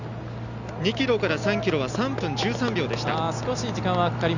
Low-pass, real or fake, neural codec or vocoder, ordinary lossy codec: 7.2 kHz; real; none; none